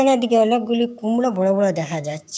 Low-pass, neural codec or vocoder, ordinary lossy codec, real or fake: none; codec, 16 kHz, 6 kbps, DAC; none; fake